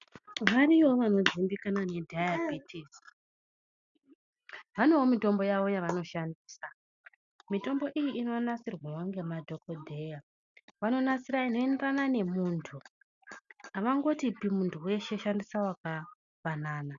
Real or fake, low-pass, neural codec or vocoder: real; 7.2 kHz; none